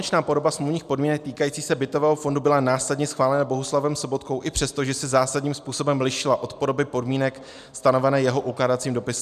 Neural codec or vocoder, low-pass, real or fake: none; 14.4 kHz; real